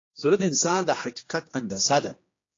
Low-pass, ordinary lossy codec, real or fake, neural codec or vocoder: 7.2 kHz; AAC, 32 kbps; fake; codec, 16 kHz, 1 kbps, X-Codec, HuBERT features, trained on general audio